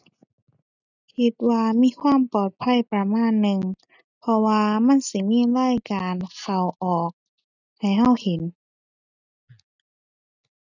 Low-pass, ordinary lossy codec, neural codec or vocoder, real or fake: 7.2 kHz; none; none; real